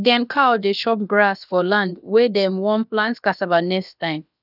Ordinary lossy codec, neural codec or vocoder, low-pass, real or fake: none; codec, 16 kHz, about 1 kbps, DyCAST, with the encoder's durations; 5.4 kHz; fake